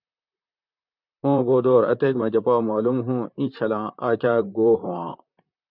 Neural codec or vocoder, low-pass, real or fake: vocoder, 44.1 kHz, 128 mel bands, Pupu-Vocoder; 5.4 kHz; fake